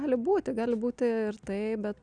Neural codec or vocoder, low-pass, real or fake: none; 9.9 kHz; real